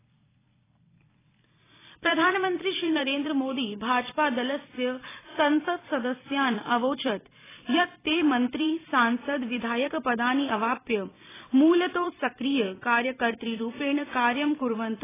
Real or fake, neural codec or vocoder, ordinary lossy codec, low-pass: fake; vocoder, 44.1 kHz, 128 mel bands every 256 samples, BigVGAN v2; AAC, 16 kbps; 3.6 kHz